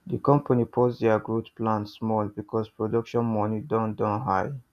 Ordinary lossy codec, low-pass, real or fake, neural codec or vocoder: none; 14.4 kHz; real; none